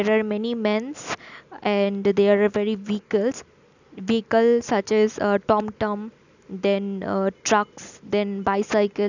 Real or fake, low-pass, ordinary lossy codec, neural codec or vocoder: real; 7.2 kHz; none; none